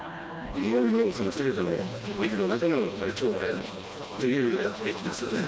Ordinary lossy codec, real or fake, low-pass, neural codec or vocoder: none; fake; none; codec, 16 kHz, 1 kbps, FreqCodec, smaller model